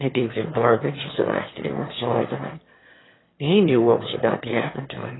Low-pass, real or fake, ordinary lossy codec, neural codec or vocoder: 7.2 kHz; fake; AAC, 16 kbps; autoencoder, 22.05 kHz, a latent of 192 numbers a frame, VITS, trained on one speaker